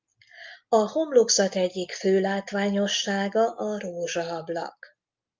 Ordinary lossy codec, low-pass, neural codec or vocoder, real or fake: Opus, 24 kbps; 7.2 kHz; none; real